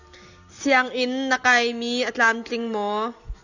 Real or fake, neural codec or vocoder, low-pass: real; none; 7.2 kHz